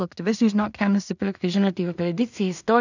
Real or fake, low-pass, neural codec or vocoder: fake; 7.2 kHz; codec, 16 kHz in and 24 kHz out, 0.4 kbps, LongCat-Audio-Codec, two codebook decoder